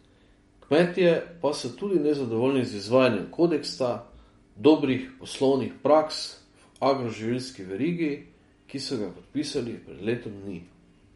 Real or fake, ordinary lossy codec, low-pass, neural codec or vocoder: real; MP3, 48 kbps; 14.4 kHz; none